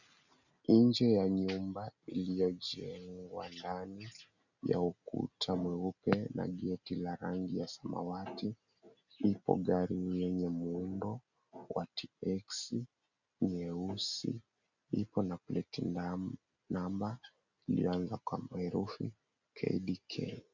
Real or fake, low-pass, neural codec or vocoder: real; 7.2 kHz; none